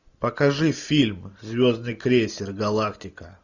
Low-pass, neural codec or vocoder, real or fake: 7.2 kHz; none; real